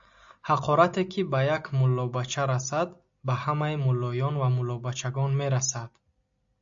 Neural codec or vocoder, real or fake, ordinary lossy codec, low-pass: none; real; AAC, 64 kbps; 7.2 kHz